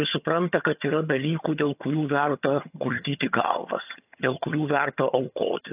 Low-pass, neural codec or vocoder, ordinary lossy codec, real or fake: 3.6 kHz; vocoder, 22.05 kHz, 80 mel bands, HiFi-GAN; AAC, 32 kbps; fake